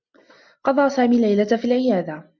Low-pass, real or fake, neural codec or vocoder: 7.2 kHz; real; none